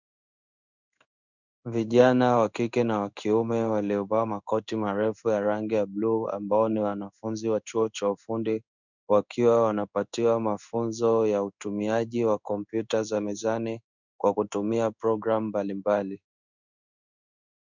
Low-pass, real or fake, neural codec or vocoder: 7.2 kHz; fake; codec, 16 kHz in and 24 kHz out, 1 kbps, XY-Tokenizer